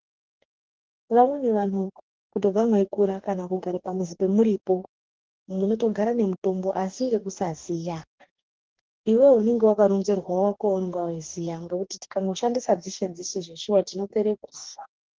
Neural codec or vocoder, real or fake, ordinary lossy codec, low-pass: codec, 44.1 kHz, 2.6 kbps, DAC; fake; Opus, 16 kbps; 7.2 kHz